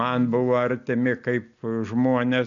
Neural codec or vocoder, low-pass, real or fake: none; 7.2 kHz; real